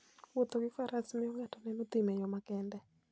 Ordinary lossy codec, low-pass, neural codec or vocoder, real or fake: none; none; none; real